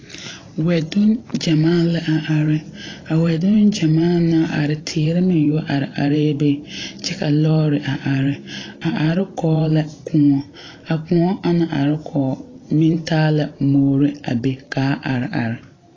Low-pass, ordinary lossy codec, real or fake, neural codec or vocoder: 7.2 kHz; AAC, 32 kbps; fake; vocoder, 44.1 kHz, 128 mel bands every 512 samples, BigVGAN v2